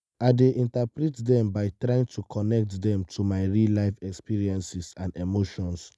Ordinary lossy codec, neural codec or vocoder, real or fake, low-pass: none; none; real; none